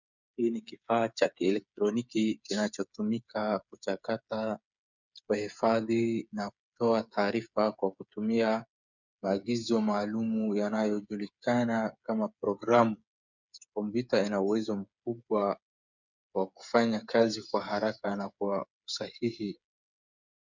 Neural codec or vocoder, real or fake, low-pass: codec, 16 kHz, 8 kbps, FreqCodec, smaller model; fake; 7.2 kHz